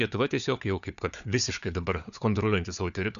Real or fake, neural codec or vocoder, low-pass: fake; codec, 16 kHz, 4 kbps, FunCodec, trained on Chinese and English, 50 frames a second; 7.2 kHz